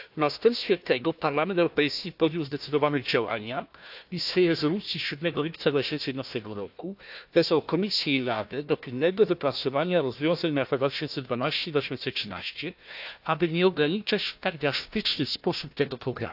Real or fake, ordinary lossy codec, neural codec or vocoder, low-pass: fake; AAC, 48 kbps; codec, 16 kHz, 1 kbps, FunCodec, trained on Chinese and English, 50 frames a second; 5.4 kHz